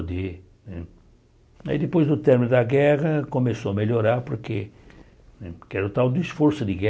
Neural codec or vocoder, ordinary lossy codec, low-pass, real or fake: none; none; none; real